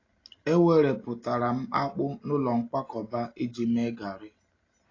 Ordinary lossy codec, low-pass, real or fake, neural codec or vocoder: AAC, 32 kbps; 7.2 kHz; real; none